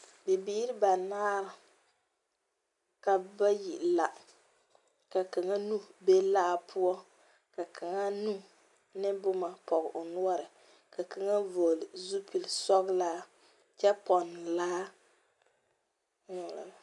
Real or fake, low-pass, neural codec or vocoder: real; 10.8 kHz; none